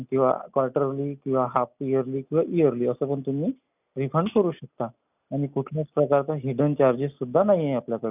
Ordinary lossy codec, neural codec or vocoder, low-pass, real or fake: none; none; 3.6 kHz; real